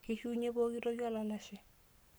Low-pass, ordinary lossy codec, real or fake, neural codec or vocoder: none; none; fake; codec, 44.1 kHz, 7.8 kbps, Pupu-Codec